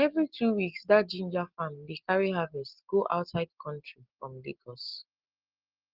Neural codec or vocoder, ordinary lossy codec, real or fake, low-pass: none; Opus, 16 kbps; real; 5.4 kHz